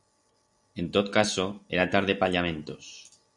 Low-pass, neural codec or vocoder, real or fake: 10.8 kHz; none; real